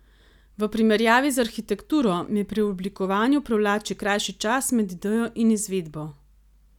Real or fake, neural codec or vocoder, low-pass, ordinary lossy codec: real; none; 19.8 kHz; none